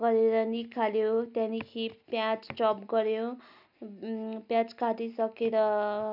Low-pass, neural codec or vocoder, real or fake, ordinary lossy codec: 5.4 kHz; none; real; none